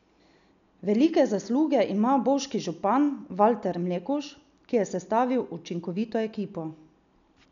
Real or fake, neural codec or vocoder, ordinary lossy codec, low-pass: real; none; none; 7.2 kHz